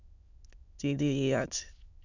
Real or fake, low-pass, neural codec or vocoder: fake; 7.2 kHz; autoencoder, 22.05 kHz, a latent of 192 numbers a frame, VITS, trained on many speakers